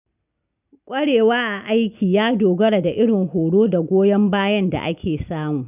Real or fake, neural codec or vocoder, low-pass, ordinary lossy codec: real; none; 3.6 kHz; none